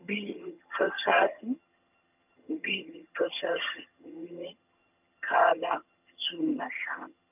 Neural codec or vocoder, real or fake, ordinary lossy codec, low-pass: vocoder, 22.05 kHz, 80 mel bands, HiFi-GAN; fake; none; 3.6 kHz